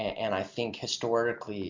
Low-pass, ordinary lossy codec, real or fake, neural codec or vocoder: 7.2 kHz; MP3, 64 kbps; real; none